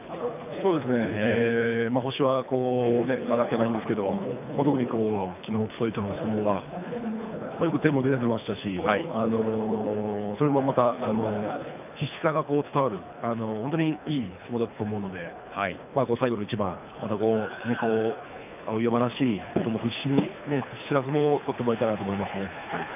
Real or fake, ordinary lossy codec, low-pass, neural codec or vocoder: fake; none; 3.6 kHz; codec, 24 kHz, 3 kbps, HILCodec